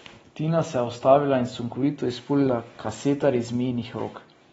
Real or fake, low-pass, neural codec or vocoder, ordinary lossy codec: real; 19.8 kHz; none; AAC, 24 kbps